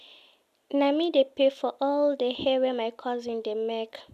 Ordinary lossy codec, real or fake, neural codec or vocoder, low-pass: none; real; none; 14.4 kHz